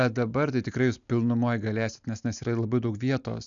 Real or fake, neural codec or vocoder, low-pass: real; none; 7.2 kHz